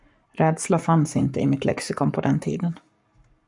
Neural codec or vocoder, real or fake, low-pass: codec, 44.1 kHz, 7.8 kbps, Pupu-Codec; fake; 10.8 kHz